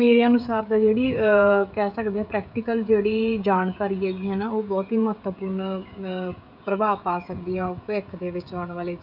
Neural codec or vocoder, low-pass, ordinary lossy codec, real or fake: codec, 16 kHz, 16 kbps, FreqCodec, smaller model; 5.4 kHz; none; fake